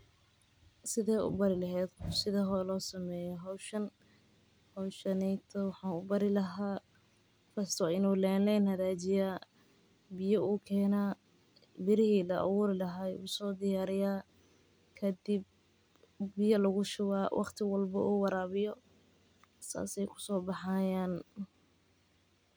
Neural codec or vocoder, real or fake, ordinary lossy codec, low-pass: none; real; none; none